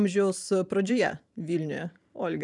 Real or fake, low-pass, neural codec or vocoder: fake; 10.8 kHz; vocoder, 44.1 kHz, 128 mel bands every 512 samples, BigVGAN v2